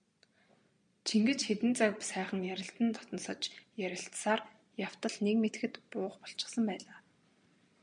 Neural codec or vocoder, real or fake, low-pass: none; real; 9.9 kHz